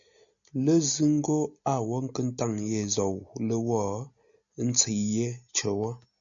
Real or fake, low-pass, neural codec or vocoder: real; 7.2 kHz; none